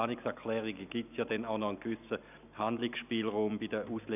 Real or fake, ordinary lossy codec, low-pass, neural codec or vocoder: real; none; 3.6 kHz; none